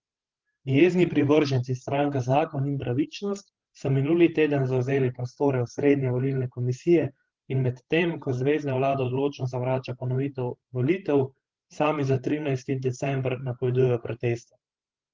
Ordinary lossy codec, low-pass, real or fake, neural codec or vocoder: Opus, 16 kbps; 7.2 kHz; fake; codec, 16 kHz, 16 kbps, FreqCodec, larger model